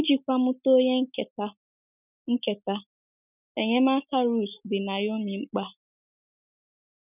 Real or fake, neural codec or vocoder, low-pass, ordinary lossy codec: real; none; 3.6 kHz; none